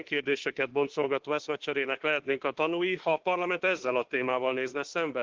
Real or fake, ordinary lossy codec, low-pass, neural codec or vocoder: fake; Opus, 16 kbps; 7.2 kHz; autoencoder, 48 kHz, 32 numbers a frame, DAC-VAE, trained on Japanese speech